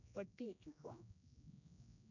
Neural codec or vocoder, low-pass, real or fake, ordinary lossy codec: codec, 16 kHz, 1 kbps, X-Codec, HuBERT features, trained on general audio; 7.2 kHz; fake; none